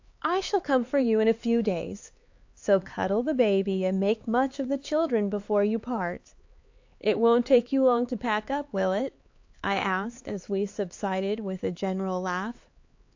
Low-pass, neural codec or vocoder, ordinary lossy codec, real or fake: 7.2 kHz; codec, 16 kHz, 4 kbps, X-Codec, HuBERT features, trained on LibriSpeech; AAC, 48 kbps; fake